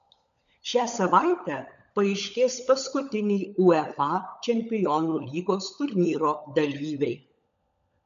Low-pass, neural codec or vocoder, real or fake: 7.2 kHz; codec, 16 kHz, 16 kbps, FunCodec, trained on LibriTTS, 50 frames a second; fake